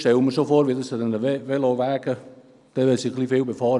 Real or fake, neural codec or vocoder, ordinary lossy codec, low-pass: real; none; none; 10.8 kHz